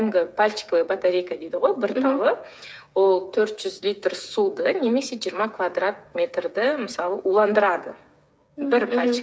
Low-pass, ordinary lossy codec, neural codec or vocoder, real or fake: none; none; codec, 16 kHz, 8 kbps, FreqCodec, smaller model; fake